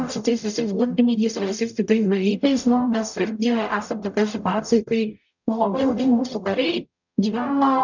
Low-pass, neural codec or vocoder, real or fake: 7.2 kHz; codec, 44.1 kHz, 0.9 kbps, DAC; fake